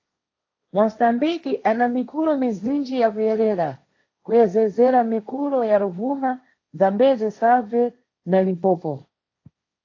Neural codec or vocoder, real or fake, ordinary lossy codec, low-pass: codec, 16 kHz, 1.1 kbps, Voila-Tokenizer; fake; AAC, 48 kbps; 7.2 kHz